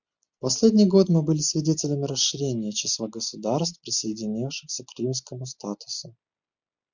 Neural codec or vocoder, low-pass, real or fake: none; 7.2 kHz; real